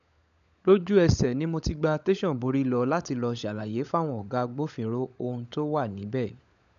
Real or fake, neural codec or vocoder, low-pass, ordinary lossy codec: fake; codec, 16 kHz, 16 kbps, FunCodec, trained on LibriTTS, 50 frames a second; 7.2 kHz; none